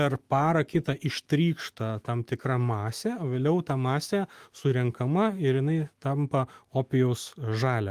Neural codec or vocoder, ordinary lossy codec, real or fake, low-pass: autoencoder, 48 kHz, 128 numbers a frame, DAC-VAE, trained on Japanese speech; Opus, 24 kbps; fake; 14.4 kHz